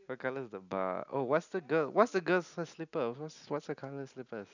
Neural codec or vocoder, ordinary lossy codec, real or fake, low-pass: none; none; real; 7.2 kHz